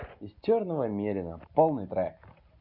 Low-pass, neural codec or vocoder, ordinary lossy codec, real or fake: 5.4 kHz; none; none; real